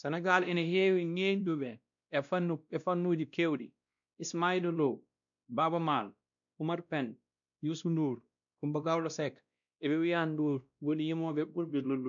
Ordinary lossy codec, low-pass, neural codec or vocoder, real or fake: MP3, 96 kbps; 7.2 kHz; codec, 16 kHz, 1 kbps, X-Codec, WavLM features, trained on Multilingual LibriSpeech; fake